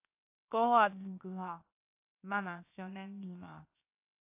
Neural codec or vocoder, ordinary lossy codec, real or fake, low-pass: codec, 16 kHz, 0.7 kbps, FocalCodec; AAC, 24 kbps; fake; 3.6 kHz